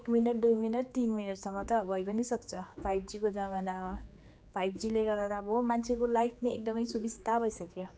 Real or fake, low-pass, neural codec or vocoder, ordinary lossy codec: fake; none; codec, 16 kHz, 4 kbps, X-Codec, HuBERT features, trained on general audio; none